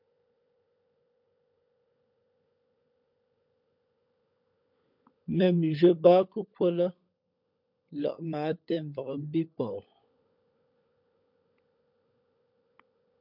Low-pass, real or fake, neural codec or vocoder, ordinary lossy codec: 5.4 kHz; fake; codec, 16 kHz, 16 kbps, FunCodec, trained on LibriTTS, 50 frames a second; MP3, 48 kbps